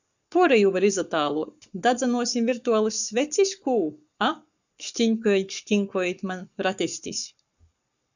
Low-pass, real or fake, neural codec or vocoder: 7.2 kHz; fake; codec, 44.1 kHz, 7.8 kbps, Pupu-Codec